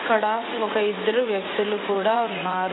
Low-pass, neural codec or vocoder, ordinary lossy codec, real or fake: 7.2 kHz; vocoder, 44.1 kHz, 80 mel bands, Vocos; AAC, 16 kbps; fake